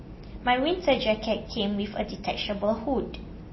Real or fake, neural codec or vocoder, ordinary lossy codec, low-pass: real; none; MP3, 24 kbps; 7.2 kHz